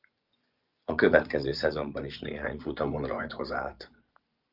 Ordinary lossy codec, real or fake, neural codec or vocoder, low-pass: Opus, 32 kbps; fake; vocoder, 24 kHz, 100 mel bands, Vocos; 5.4 kHz